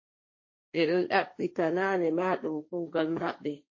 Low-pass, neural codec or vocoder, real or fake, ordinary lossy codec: 7.2 kHz; codec, 16 kHz, 1.1 kbps, Voila-Tokenizer; fake; MP3, 32 kbps